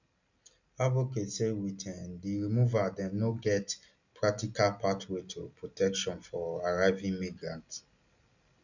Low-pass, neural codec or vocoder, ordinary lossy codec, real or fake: 7.2 kHz; none; none; real